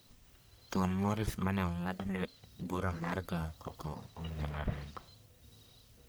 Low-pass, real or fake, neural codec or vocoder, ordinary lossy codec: none; fake; codec, 44.1 kHz, 1.7 kbps, Pupu-Codec; none